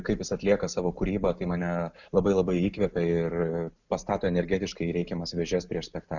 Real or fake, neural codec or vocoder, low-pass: real; none; 7.2 kHz